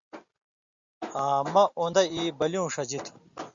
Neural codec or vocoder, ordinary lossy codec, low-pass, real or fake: none; Opus, 64 kbps; 7.2 kHz; real